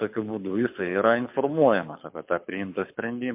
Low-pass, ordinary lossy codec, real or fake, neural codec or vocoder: 3.6 kHz; MP3, 32 kbps; fake; codec, 24 kHz, 6 kbps, HILCodec